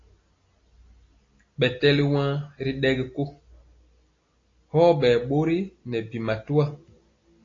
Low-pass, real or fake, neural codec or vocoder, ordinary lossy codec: 7.2 kHz; real; none; AAC, 32 kbps